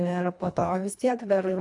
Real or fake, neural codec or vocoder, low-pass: fake; codec, 24 kHz, 1.5 kbps, HILCodec; 10.8 kHz